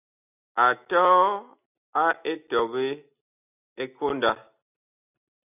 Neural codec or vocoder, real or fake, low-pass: none; real; 3.6 kHz